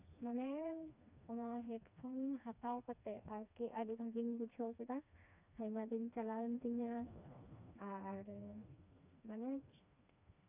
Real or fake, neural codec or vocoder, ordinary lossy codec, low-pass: fake; codec, 16 kHz, 2 kbps, FreqCodec, smaller model; none; 3.6 kHz